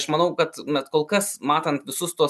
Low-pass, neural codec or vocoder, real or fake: 14.4 kHz; none; real